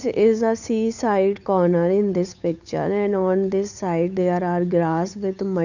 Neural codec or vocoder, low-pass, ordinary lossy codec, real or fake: codec, 16 kHz, 8 kbps, FunCodec, trained on Chinese and English, 25 frames a second; 7.2 kHz; none; fake